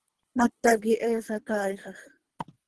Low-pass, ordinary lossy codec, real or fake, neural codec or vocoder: 10.8 kHz; Opus, 16 kbps; fake; codec, 24 kHz, 3 kbps, HILCodec